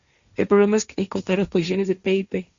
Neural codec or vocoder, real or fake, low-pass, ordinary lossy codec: codec, 16 kHz, 1.1 kbps, Voila-Tokenizer; fake; 7.2 kHz; Opus, 64 kbps